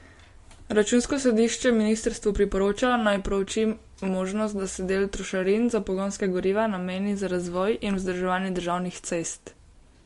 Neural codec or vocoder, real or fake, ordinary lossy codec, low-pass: none; real; MP3, 48 kbps; 14.4 kHz